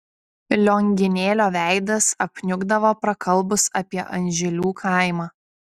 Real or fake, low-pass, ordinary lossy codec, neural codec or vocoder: real; 14.4 kHz; Opus, 64 kbps; none